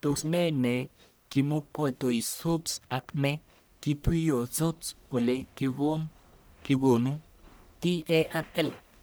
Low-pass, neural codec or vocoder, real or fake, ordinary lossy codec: none; codec, 44.1 kHz, 1.7 kbps, Pupu-Codec; fake; none